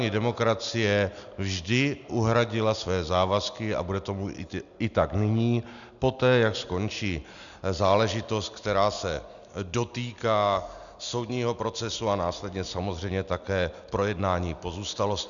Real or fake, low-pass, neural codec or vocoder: real; 7.2 kHz; none